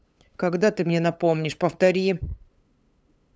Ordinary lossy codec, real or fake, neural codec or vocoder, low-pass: none; fake; codec, 16 kHz, 8 kbps, FunCodec, trained on LibriTTS, 25 frames a second; none